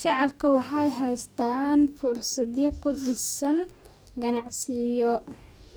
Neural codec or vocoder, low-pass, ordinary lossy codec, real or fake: codec, 44.1 kHz, 2.6 kbps, DAC; none; none; fake